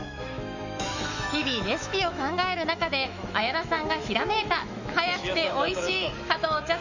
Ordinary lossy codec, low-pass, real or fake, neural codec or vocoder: none; 7.2 kHz; fake; autoencoder, 48 kHz, 128 numbers a frame, DAC-VAE, trained on Japanese speech